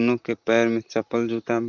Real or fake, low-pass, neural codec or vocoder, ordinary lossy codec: fake; 7.2 kHz; vocoder, 44.1 kHz, 128 mel bands, Pupu-Vocoder; Opus, 64 kbps